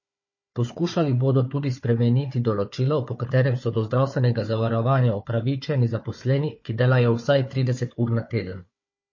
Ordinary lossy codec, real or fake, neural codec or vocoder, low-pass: MP3, 32 kbps; fake; codec, 16 kHz, 4 kbps, FunCodec, trained on Chinese and English, 50 frames a second; 7.2 kHz